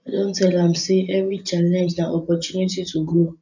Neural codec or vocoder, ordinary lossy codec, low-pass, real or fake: vocoder, 24 kHz, 100 mel bands, Vocos; none; 7.2 kHz; fake